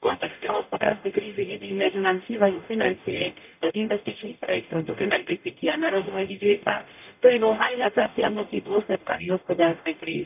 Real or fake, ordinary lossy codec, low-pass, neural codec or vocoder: fake; none; 3.6 kHz; codec, 44.1 kHz, 0.9 kbps, DAC